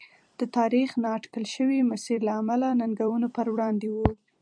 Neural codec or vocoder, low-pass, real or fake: vocoder, 44.1 kHz, 128 mel bands every 256 samples, BigVGAN v2; 9.9 kHz; fake